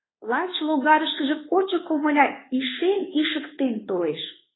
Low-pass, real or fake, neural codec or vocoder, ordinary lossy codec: 7.2 kHz; fake; codec, 24 kHz, 1.2 kbps, DualCodec; AAC, 16 kbps